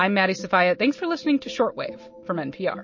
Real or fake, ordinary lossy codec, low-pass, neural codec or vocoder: real; MP3, 32 kbps; 7.2 kHz; none